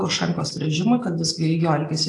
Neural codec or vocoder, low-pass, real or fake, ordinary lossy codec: none; 10.8 kHz; real; AAC, 48 kbps